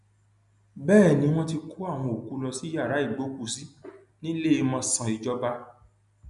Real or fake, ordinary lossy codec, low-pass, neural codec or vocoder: real; none; 10.8 kHz; none